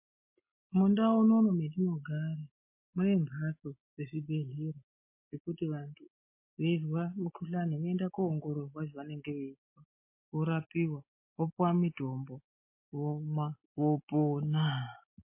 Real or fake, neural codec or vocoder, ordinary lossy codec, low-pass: real; none; MP3, 24 kbps; 3.6 kHz